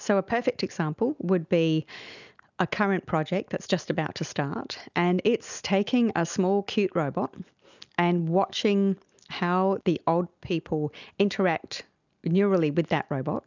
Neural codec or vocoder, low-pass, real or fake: none; 7.2 kHz; real